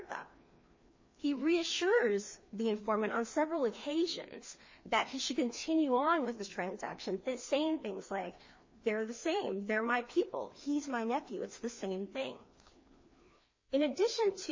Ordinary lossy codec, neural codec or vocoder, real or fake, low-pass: MP3, 32 kbps; codec, 16 kHz, 2 kbps, FreqCodec, larger model; fake; 7.2 kHz